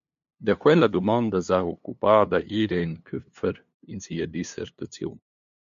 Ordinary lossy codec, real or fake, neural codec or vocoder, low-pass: MP3, 64 kbps; fake; codec, 16 kHz, 2 kbps, FunCodec, trained on LibriTTS, 25 frames a second; 7.2 kHz